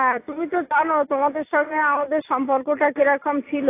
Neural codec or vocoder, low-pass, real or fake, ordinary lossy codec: none; 3.6 kHz; real; AAC, 24 kbps